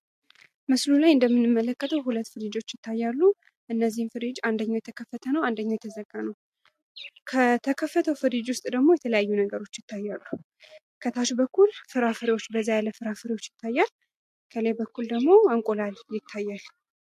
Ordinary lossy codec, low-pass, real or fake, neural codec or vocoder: MP3, 64 kbps; 14.4 kHz; real; none